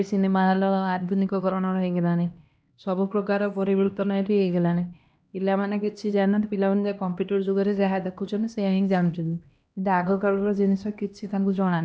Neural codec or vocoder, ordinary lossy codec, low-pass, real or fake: codec, 16 kHz, 1 kbps, X-Codec, HuBERT features, trained on LibriSpeech; none; none; fake